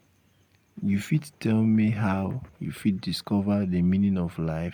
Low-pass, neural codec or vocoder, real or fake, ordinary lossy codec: 19.8 kHz; none; real; MP3, 96 kbps